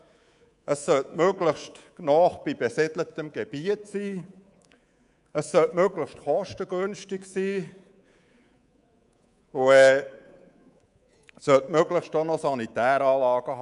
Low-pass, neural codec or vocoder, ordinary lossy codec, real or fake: 10.8 kHz; codec, 24 kHz, 3.1 kbps, DualCodec; none; fake